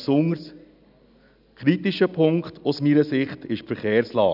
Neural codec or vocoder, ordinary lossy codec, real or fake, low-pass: none; none; real; 5.4 kHz